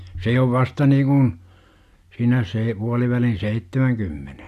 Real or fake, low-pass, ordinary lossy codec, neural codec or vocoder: real; 14.4 kHz; AAC, 64 kbps; none